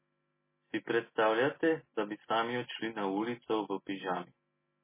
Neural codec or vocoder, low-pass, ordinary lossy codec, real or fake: none; 3.6 kHz; MP3, 16 kbps; real